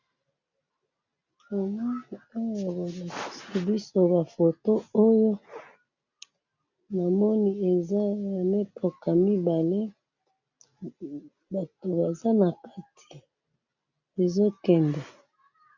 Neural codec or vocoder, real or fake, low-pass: none; real; 7.2 kHz